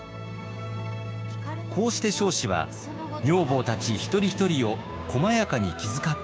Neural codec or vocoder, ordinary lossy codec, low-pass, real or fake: codec, 16 kHz, 6 kbps, DAC; none; none; fake